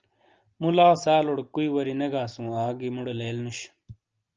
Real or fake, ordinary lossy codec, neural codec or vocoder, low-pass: real; Opus, 24 kbps; none; 7.2 kHz